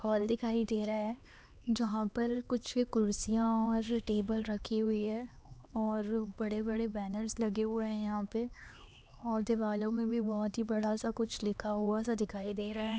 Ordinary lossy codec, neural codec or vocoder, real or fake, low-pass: none; codec, 16 kHz, 2 kbps, X-Codec, HuBERT features, trained on LibriSpeech; fake; none